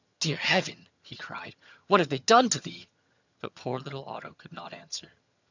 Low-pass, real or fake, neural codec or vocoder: 7.2 kHz; fake; vocoder, 22.05 kHz, 80 mel bands, HiFi-GAN